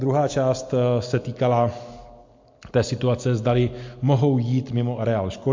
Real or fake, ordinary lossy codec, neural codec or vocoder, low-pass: real; MP3, 48 kbps; none; 7.2 kHz